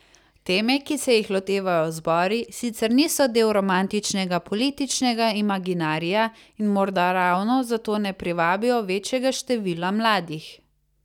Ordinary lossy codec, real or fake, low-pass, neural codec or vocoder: none; real; 19.8 kHz; none